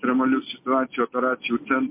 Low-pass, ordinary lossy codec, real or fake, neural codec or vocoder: 3.6 kHz; MP3, 24 kbps; real; none